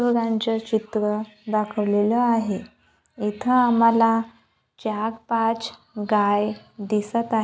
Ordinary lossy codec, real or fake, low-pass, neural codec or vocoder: none; real; none; none